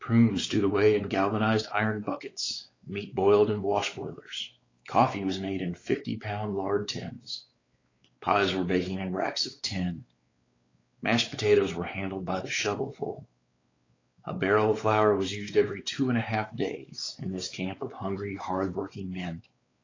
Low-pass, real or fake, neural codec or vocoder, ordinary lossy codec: 7.2 kHz; fake; codec, 16 kHz, 4 kbps, X-Codec, WavLM features, trained on Multilingual LibriSpeech; AAC, 32 kbps